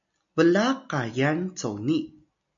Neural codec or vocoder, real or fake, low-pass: none; real; 7.2 kHz